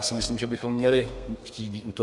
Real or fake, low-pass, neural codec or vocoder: fake; 10.8 kHz; codec, 32 kHz, 1.9 kbps, SNAC